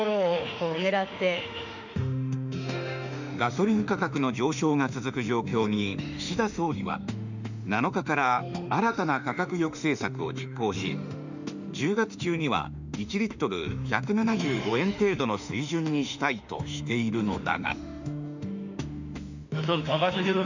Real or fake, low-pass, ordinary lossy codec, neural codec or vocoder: fake; 7.2 kHz; none; autoencoder, 48 kHz, 32 numbers a frame, DAC-VAE, trained on Japanese speech